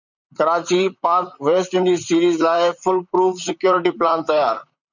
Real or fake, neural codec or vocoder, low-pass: fake; vocoder, 44.1 kHz, 128 mel bands, Pupu-Vocoder; 7.2 kHz